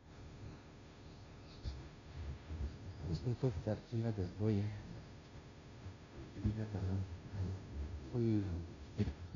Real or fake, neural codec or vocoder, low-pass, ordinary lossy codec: fake; codec, 16 kHz, 0.5 kbps, FunCodec, trained on Chinese and English, 25 frames a second; 7.2 kHz; none